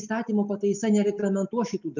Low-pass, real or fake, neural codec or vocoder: 7.2 kHz; real; none